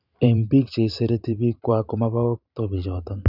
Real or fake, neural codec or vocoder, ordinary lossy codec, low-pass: fake; vocoder, 44.1 kHz, 128 mel bands every 512 samples, BigVGAN v2; none; 5.4 kHz